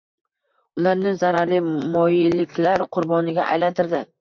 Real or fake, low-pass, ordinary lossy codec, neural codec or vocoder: fake; 7.2 kHz; MP3, 48 kbps; vocoder, 44.1 kHz, 128 mel bands, Pupu-Vocoder